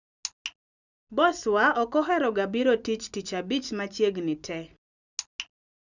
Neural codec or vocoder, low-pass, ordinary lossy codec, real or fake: none; 7.2 kHz; none; real